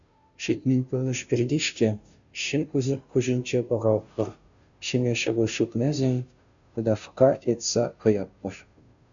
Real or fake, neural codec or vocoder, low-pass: fake; codec, 16 kHz, 0.5 kbps, FunCodec, trained on Chinese and English, 25 frames a second; 7.2 kHz